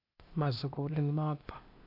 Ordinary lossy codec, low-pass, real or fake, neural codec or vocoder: AAC, 32 kbps; 5.4 kHz; fake; codec, 16 kHz, 0.8 kbps, ZipCodec